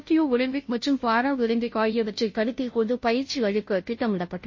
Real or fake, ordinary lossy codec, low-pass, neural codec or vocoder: fake; MP3, 32 kbps; 7.2 kHz; codec, 16 kHz, 0.5 kbps, FunCodec, trained on Chinese and English, 25 frames a second